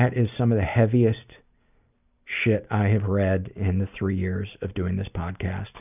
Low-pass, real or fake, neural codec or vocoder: 3.6 kHz; real; none